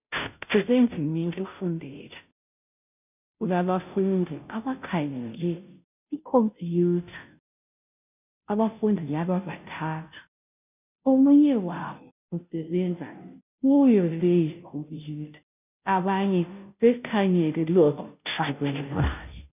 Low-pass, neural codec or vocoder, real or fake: 3.6 kHz; codec, 16 kHz, 0.5 kbps, FunCodec, trained on Chinese and English, 25 frames a second; fake